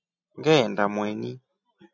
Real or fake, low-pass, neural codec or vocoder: real; 7.2 kHz; none